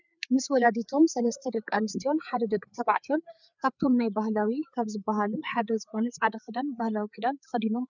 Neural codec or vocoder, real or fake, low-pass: codec, 16 kHz, 4 kbps, FreqCodec, larger model; fake; 7.2 kHz